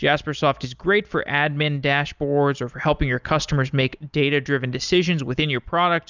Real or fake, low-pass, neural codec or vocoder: real; 7.2 kHz; none